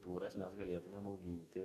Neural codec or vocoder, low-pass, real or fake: codec, 44.1 kHz, 2.6 kbps, DAC; 14.4 kHz; fake